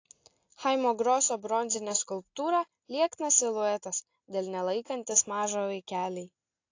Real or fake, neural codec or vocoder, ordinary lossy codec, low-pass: real; none; AAC, 48 kbps; 7.2 kHz